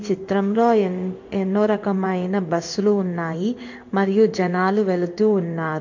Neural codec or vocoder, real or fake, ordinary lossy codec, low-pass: codec, 16 kHz in and 24 kHz out, 1 kbps, XY-Tokenizer; fake; MP3, 48 kbps; 7.2 kHz